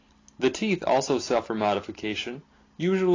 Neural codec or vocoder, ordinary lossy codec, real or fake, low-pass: none; AAC, 32 kbps; real; 7.2 kHz